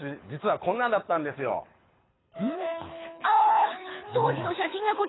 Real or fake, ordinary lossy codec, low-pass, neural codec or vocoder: fake; AAC, 16 kbps; 7.2 kHz; codec, 24 kHz, 6 kbps, HILCodec